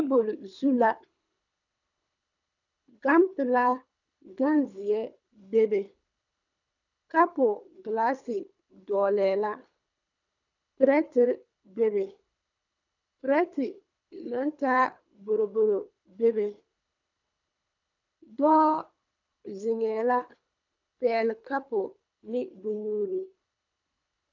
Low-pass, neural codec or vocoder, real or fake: 7.2 kHz; codec, 24 kHz, 3 kbps, HILCodec; fake